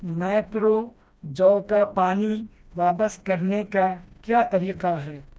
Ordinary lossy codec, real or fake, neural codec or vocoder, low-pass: none; fake; codec, 16 kHz, 1 kbps, FreqCodec, smaller model; none